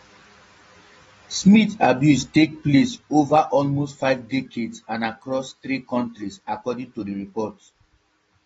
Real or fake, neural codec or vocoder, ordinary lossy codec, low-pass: real; none; AAC, 24 kbps; 19.8 kHz